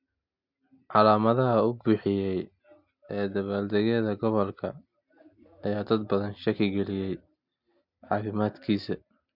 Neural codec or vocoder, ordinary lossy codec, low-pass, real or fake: none; MP3, 48 kbps; 5.4 kHz; real